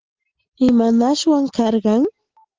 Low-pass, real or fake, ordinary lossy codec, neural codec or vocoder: 7.2 kHz; real; Opus, 16 kbps; none